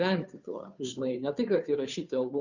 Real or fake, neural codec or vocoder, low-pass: fake; codec, 16 kHz, 2 kbps, FunCodec, trained on Chinese and English, 25 frames a second; 7.2 kHz